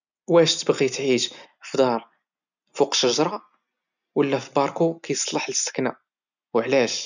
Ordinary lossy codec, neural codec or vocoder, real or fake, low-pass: none; none; real; 7.2 kHz